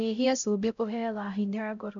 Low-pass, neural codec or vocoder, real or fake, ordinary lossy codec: 7.2 kHz; codec, 16 kHz, 0.5 kbps, X-Codec, HuBERT features, trained on LibriSpeech; fake; none